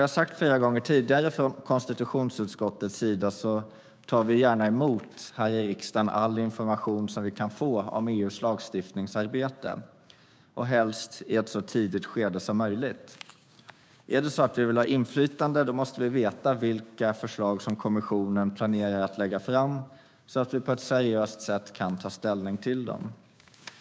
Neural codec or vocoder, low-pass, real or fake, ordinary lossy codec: codec, 16 kHz, 6 kbps, DAC; none; fake; none